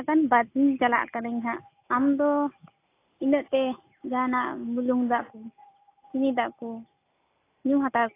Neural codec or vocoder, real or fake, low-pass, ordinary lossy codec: none; real; 3.6 kHz; AAC, 24 kbps